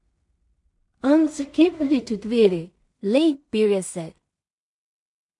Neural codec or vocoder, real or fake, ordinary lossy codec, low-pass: codec, 16 kHz in and 24 kHz out, 0.4 kbps, LongCat-Audio-Codec, two codebook decoder; fake; MP3, 64 kbps; 10.8 kHz